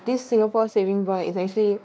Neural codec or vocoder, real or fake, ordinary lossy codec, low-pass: codec, 16 kHz, 2 kbps, X-Codec, WavLM features, trained on Multilingual LibriSpeech; fake; none; none